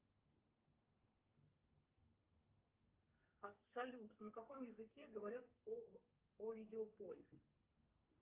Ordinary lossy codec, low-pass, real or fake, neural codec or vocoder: Opus, 24 kbps; 3.6 kHz; fake; codec, 32 kHz, 1.9 kbps, SNAC